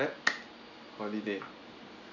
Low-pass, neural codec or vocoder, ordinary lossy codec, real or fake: 7.2 kHz; none; none; real